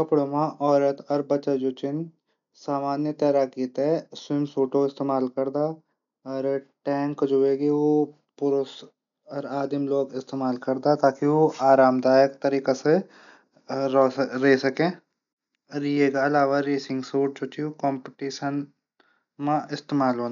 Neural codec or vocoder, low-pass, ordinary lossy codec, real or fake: none; 7.2 kHz; none; real